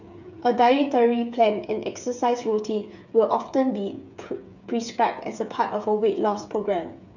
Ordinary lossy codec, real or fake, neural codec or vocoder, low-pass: none; fake; codec, 16 kHz, 8 kbps, FreqCodec, smaller model; 7.2 kHz